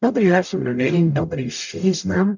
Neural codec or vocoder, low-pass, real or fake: codec, 44.1 kHz, 0.9 kbps, DAC; 7.2 kHz; fake